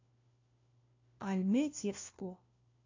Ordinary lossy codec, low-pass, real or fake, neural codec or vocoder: AAC, 48 kbps; 7.2 kHz; fake; codec, 16 kHz, 1 kbps, FunCodec, trained on LibriTTS, 50 frames a second